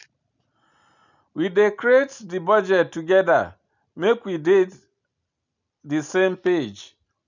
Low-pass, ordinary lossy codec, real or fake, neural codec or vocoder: 7.2 kHz; none; real; none